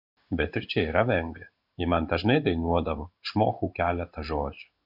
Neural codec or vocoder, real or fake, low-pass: codec, 16 kHz in and 24 kHz out, 1 kbps, XY-Tokenizer; fake; 5.4 kHz